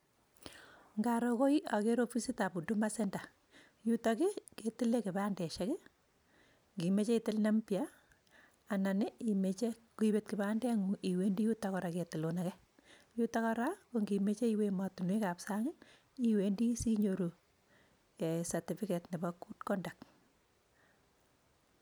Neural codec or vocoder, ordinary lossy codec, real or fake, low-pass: none; none; real; none